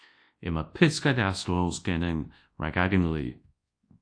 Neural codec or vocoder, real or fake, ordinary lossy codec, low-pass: codec, 24 kHz, 0.9 kbps, WavTokenizer, large speech release; fake; AAC, 48 kbps; 9.9 kHz